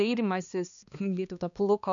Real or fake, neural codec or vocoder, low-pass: fake; codec, 16 kHz, 2 kbps, X-Codec, HuBERT features, trained on balanced general audio; 7.2 kHz